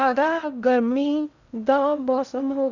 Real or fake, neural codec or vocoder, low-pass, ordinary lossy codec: fake; codec, 16 kHz in and 24 kHz out, 0.6 kbps, FocalCodec, streaming, 4096 codes; 7.2 kHz; none